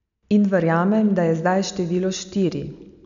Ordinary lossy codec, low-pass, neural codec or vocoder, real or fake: none; 7.2 kHz; none; real